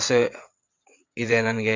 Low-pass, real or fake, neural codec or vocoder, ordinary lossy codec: 7.2 kHz; fake; vocoder, 22.05 kHz, 80 mel bands, WaveNeXt; MP3, 48 kbps